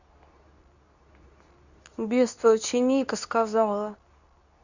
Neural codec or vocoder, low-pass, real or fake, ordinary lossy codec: codec, 24 kHz, 0.9 kbps, WavTokenizer, medium speech release version 2; 7.2 kHz; fake; none